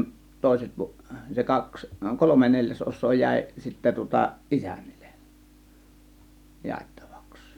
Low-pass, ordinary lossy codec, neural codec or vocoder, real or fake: 19.8 kHz; none; none; real